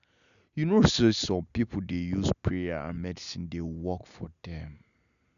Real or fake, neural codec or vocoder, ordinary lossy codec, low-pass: real; none; none; 7.2 kHz